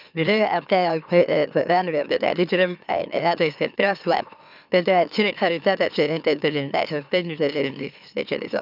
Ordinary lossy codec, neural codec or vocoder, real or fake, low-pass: none; autoencoder, 44.1 kHz, a latent of 192 numbers a frame, MeloTTS; fake; 5.4 kHz